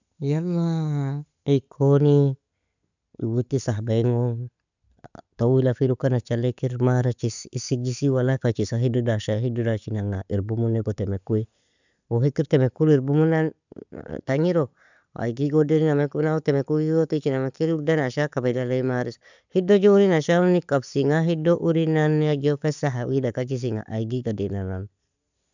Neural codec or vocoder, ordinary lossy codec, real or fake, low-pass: none; none; real; 7.2 kHz